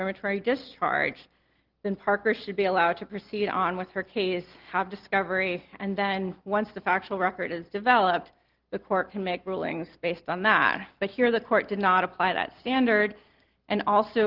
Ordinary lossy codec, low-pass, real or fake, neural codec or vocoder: Opus, 16 kbps; 5.4 kHz; real; none